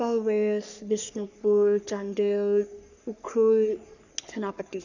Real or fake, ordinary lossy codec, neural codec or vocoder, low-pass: fake; none; codec, 44.1 kHz, 3.4 kbps, Pupu-Codec; 7.2 kHz